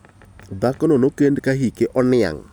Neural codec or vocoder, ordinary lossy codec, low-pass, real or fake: none; none; none; real